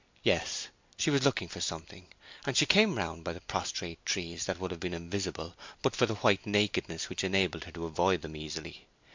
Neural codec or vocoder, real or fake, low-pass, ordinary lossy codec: none; real; 7.2 kHz; MP3, 64 kbps